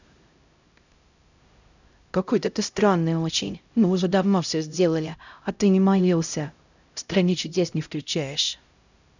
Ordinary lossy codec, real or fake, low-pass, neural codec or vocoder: none; fake; 7.2 kHz; codec, 16 kHz, 0.5 kbps, X-Codec, HuBERT features, trained on LibriSpeech